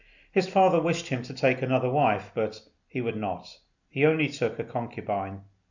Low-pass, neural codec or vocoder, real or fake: 7.2 kHz; none; real